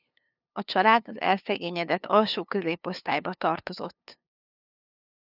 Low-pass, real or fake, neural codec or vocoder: 5.4 kHz; fake; codec, 16 kHz, 2 kbps, FunCodec, trained on LibriTTS, 25 frames a second